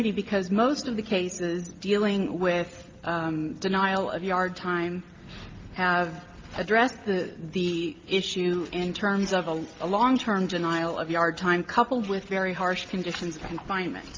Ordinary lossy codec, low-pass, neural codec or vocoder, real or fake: Opus, 24 kbps; 7.2 kHz; none; real